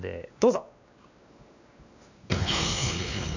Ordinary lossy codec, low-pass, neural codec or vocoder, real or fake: none; 7.2 kHz; codec, 16 kHz, 2 kbps, X-Codec, WavLM features, trained on Multilingual LibriSpeech; fake